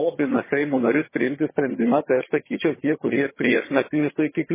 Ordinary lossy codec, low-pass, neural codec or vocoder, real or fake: MP3, 16 kbps; 3.6 kHz; vocoder, 22.05 kHz, 80 mel bands, HiFi-GAN; fake